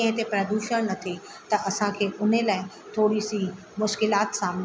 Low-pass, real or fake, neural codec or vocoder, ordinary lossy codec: none; real; none; none